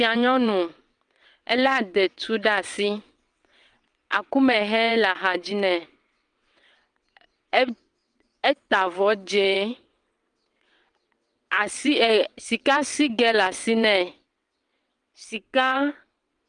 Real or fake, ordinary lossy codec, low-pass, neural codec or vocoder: fake; Opus, 32 kbps; 9.9 kHz; vocoder, 22.05 kHz, 80 mel bands, WaveNeXt